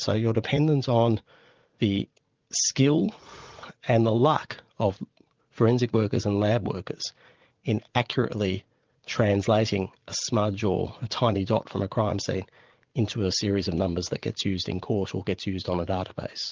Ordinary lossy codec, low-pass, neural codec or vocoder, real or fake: Opus, 32 kbps; 7.2 kHz; vocoder, 44.1 kHz, 80 mel bands, Vocos; fake